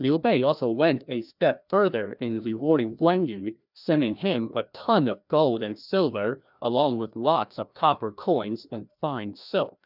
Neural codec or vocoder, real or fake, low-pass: codec, 16 kHz, 1 kbps, FreqCodec, larger model; fake; 5.4 kHz